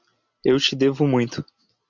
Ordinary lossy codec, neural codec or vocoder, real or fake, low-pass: MP3, 64 kbps; none; real; 7.2 kHz